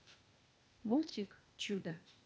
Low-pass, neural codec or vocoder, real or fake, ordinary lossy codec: none; codec, 16 kHz, 0.8 kbps, ZipCodec; fake; none